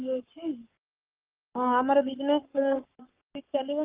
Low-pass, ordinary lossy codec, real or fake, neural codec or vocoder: 3.6 kHz; Opus, 32 kbps; fake; codec, 44.1 kHz, 7.8 kbps, Pupu-Codec